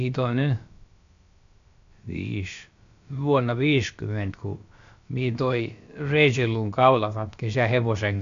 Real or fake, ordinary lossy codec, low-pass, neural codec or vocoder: fake; MP3, 64 kbps; 7.2 kHz; codec, 16 kHz, about 1 kbps, DyCAST, with the encoder's durations